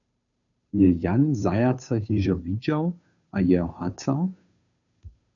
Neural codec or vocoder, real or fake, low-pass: codec, 16 kHz, 2 kbps, FunCodec, trained on Chinese and English, 25 frames a second; fake; 7.2 kHz